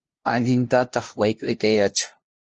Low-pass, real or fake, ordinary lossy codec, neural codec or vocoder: 7.2 kHz; fake; Opus, 16 kbps; codec, 16 kHz, 0.5 kbps, FunCodec, trained on LibriTTS, 25 frames a second